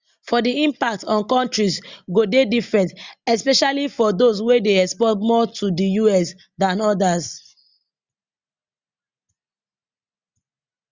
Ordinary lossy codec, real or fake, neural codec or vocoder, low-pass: Opus, 64 kbps; real; none; 7.2 kHz